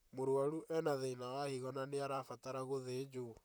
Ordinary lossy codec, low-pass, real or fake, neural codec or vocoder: none; none; fake; vocoder, 44.1 kHz, 128 mel bands, Pupu-Vocoder